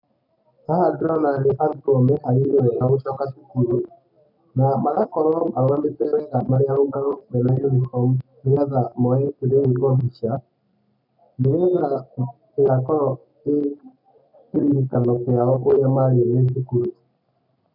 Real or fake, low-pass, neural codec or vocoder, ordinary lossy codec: real; 5.4 kHz; none; none